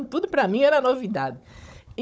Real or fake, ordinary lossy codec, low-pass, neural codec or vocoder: fake; none; none; codec, 16 kHz, 16 kbps, FunCodec, trained on Chinese and English, 50 frames a second